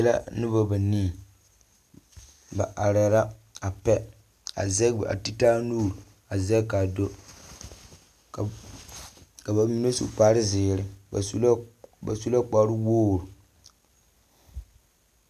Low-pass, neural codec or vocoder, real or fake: 14.4 kHz; none; real